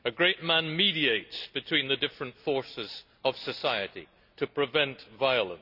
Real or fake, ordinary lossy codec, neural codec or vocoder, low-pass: real; none; none; 5.4 kHz